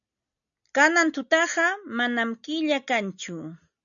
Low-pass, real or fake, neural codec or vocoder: 7.2 kHz; real; none